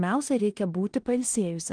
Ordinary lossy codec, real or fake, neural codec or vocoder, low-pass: Opus, 24 kbps; fake; codec, 16 kHz in and 24 kHz out, 0.9 kbps, LongCat-Audio-Codec, four codebook decoder; 9.9 kHz